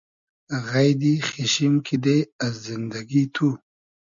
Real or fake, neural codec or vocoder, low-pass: real; none; 7.2 kHz